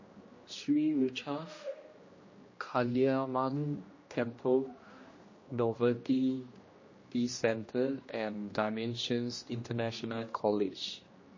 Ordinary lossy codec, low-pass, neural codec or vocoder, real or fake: MP3, 32 kbps; 7.2 kHz; codec, 16 kHz, 1 kbps, X-Codec, HuBERT features, trained on general audio; fake